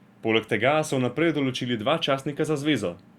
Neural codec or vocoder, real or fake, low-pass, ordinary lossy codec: none; real; 19.8 kHz; Opus, 64 kbps